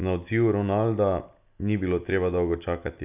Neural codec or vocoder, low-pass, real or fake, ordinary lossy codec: none; 3.6 kHz; real; none